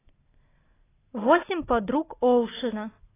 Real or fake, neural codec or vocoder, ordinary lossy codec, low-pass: real; none; AAC, 16 kbps; 3.6 kHz